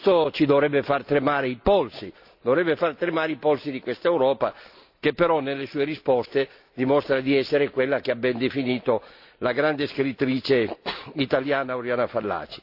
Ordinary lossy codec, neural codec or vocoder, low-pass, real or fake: AAC, 48 kbps; none; 5.4 kHz; real